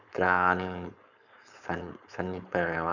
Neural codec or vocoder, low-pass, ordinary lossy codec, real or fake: codec, 16 kHz, 4.8 kbps, FACodec; 7.2 kHz; none; fake